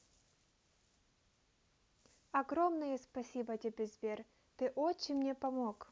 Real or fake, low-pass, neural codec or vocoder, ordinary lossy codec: real; none; none; none